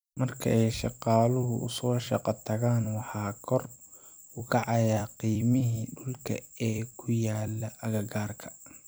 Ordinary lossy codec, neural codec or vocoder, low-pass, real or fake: none; vocoder, 44.1 kHz, 128 mel bands every 256 samples, BigVGAN v2; none; fake